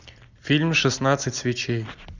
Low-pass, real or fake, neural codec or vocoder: 7.2 kHz; real; none